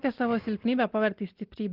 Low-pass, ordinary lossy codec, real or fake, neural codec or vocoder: 5.4 kHz; Opus, 16 kbps; real; none